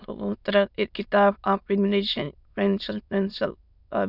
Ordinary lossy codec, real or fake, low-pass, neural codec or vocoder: none; fake; 5.4 kHz; autoencoder, 22.05 kHz, a latent of 192 numbers a frame, VITS, trained on many speakers